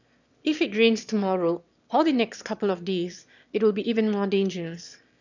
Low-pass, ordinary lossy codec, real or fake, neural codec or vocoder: 7.2 kHz; none; fake; autoencoder, 22.05 kHz, a latent of 192 numbers a frame, VITS, trained on one speaker